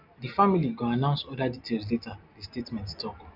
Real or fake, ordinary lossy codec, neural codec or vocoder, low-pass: real; none; none; 5.4 kHz